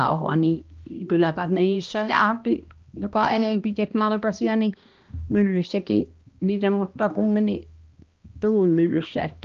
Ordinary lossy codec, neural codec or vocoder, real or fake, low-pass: Opus, 24 kbps; codec, 16 kHz, 1 kbps, X-Codec, HuBERT features, trained on balanced general audio; fake; 7.2 kHz